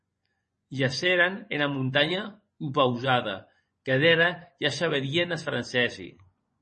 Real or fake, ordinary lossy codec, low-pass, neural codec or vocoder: fake; MP3, 32 kbps; 10.8 kHz; vocoder, 24 kHz, 100 mel bands, Vocos